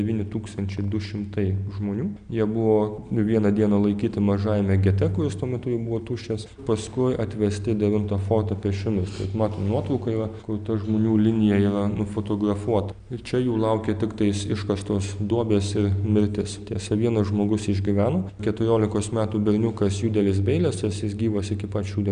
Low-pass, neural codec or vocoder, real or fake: 9.9 kHz; none; real